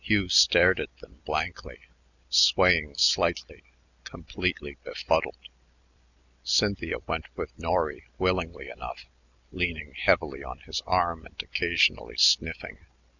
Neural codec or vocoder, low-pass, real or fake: none; 7.2 kHz; real